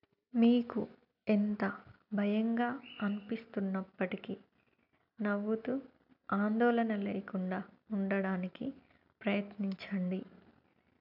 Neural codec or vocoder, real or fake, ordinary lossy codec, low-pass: none; real; none; 5.4 kHz